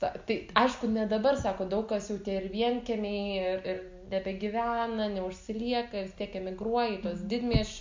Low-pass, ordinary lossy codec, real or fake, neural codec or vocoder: 7.2 kHz; MP3, 64 kbps; real; none